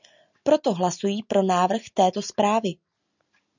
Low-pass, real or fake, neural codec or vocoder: 7.2 kHz; real; none